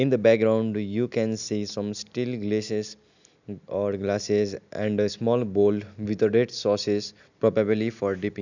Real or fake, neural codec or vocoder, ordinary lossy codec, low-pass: real; none; none; 7.2 kHz